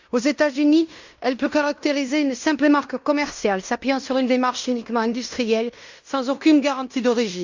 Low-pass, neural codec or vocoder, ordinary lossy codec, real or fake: 7.2 kHz; codec, 16 kHz in and 24 kHz out, 0.9 kbps, LongCat-Audio-Codec, fine tuned four codebook decoder; Opus, 64 kbps; fake